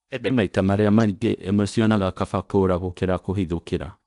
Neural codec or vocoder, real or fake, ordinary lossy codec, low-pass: codec, 16 kHz in and 24 kHz out, 0.8 kbps, FocalCodec, streaming, 65536 codes; fake; none; 10.8 kHz